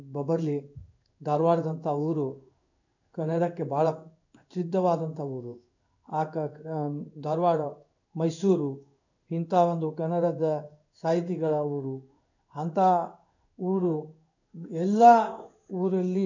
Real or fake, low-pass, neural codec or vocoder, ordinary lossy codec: fake; 7.2 kHz; codec, 16 kHz in and 24 kHz out, 1 kbps, XY-Tokenizer; none